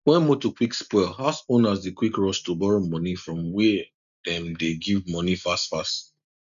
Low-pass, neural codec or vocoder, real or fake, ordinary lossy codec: 7.2 kHz; none; real; none